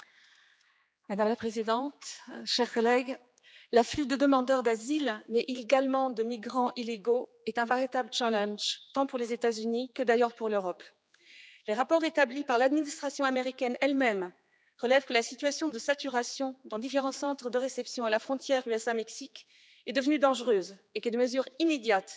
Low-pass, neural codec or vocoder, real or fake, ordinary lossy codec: none; codec, 16 kHz, 4 kbps, X-Codec, HuBERT features, trained on general audio; fake; none